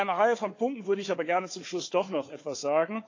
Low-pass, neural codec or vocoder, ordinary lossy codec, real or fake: 7.2 kHz; codec, 16 kHz, 4 kbps, FunCodec, trained on Chinese and English, 50 frames a second; AAC, 48 kbps; fake